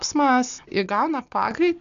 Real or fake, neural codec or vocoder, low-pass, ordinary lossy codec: real; none; 7.2 kHz; AAC, 96 kbps